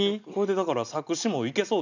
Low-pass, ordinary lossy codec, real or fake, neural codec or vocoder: 7.2 kHz; none; real; none